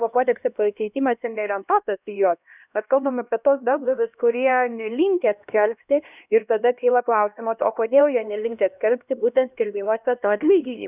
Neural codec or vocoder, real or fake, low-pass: codec, 16 kHz, 1 kbps, X-Codec, HuBERT features, trained on LibriSpeech; fake; 3.6 kHz